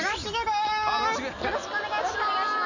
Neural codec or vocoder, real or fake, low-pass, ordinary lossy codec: none; real; 7.2 kHz; none